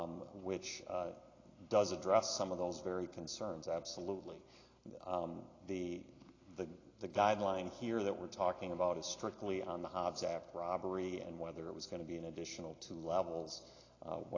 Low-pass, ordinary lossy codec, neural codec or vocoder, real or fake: 7.2 kHz; AAC, 32 kbps; none; real